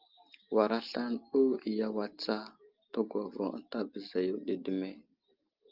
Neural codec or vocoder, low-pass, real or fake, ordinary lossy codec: none; 5.4 kHz; real; Opus, 32 kbps